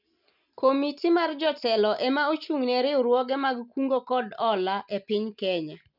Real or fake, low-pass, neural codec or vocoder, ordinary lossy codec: real; 5.4 kHz; none; none